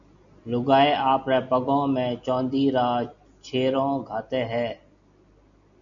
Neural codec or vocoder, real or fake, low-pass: none; real; 7.2 kHz